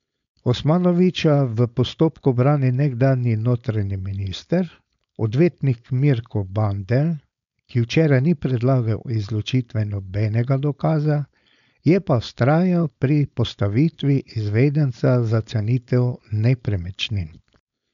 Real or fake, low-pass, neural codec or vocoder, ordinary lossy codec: fake; 7.2 kHz; codec, 16 kHz, 4.8 kbps, FACodec; none